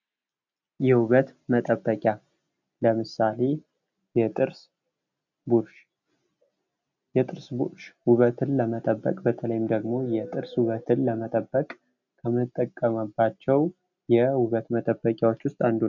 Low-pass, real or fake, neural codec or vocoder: 7.2 kHz; real; none